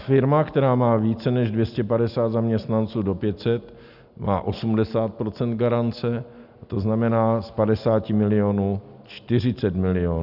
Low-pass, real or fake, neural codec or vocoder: 5.4 kHz; real; none